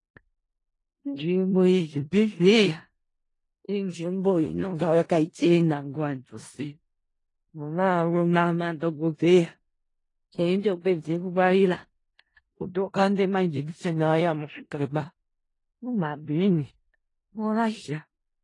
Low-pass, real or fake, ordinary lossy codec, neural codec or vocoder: 10.8 kHz; fake; AAC, 32 kbps; codec, 16 kHz in and 24 kHz out, 0.4 kbps, LongCat-Audio-Codec, four codebook decoder